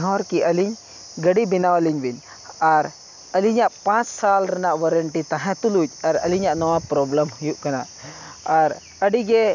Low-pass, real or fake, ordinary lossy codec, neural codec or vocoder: 7.2 kHz; real; none; none